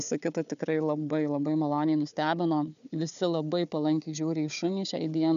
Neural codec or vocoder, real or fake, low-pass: codec, 16 kHz, 4 kbps, FunCodec, trained on Chinese and English, 50 frames a second; fake; 7.2 kHz